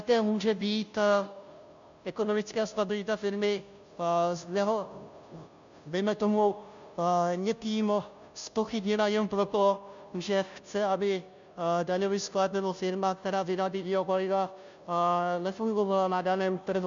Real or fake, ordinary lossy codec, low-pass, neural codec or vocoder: fake; MP3, 64 kbps; 7.2 kHz; codec, 16 kHz, 0.5 kbps, FunCodec, trained on Chinese and English, 25 frames a second